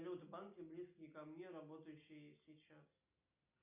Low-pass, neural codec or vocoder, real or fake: 3.6 kHz; none; real